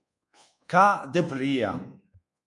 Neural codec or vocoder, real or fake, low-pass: codec, 24 kHz, 1.2 kbps, DualCodec; fake; 10.8 kHz